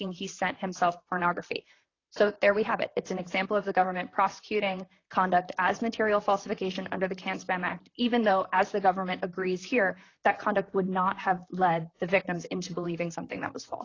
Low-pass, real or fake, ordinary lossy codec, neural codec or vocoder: 7.2 kHz; fake; AAC, 32 kbps; vocoder, 44.1 kHz, 128 mel bands, Pupu-Vocoder